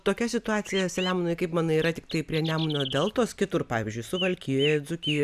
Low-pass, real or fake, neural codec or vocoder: 14.4 kHz; real; none